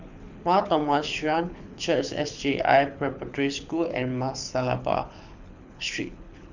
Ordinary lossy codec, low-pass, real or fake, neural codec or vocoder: none; 7.2 kHz; fake; codec, 24 kHz, 6 kbps, HILCodec